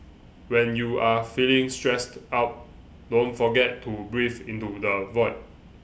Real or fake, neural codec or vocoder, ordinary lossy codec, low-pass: real; none; none; none